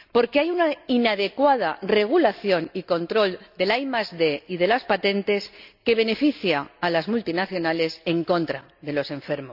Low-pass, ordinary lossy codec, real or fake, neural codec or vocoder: 5.4 kHz; none; real; none